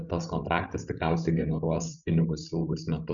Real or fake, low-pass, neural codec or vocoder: fake; 7.2 kHz; codec, 16 kHz, 16 kbps, FreqCodec, larger model